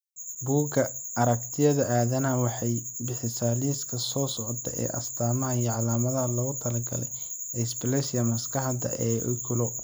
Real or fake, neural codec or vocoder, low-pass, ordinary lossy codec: real; none; none; none